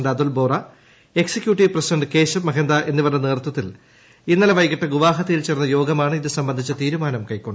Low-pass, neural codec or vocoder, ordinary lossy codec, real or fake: none; none; none; real